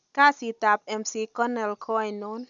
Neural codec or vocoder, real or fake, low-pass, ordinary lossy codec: none; real; 7.2 kHz; none